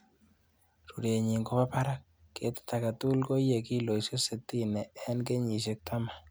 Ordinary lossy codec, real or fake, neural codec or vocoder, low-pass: none; real; none; none